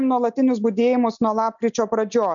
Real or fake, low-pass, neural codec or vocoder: real; 7.2 kHz; none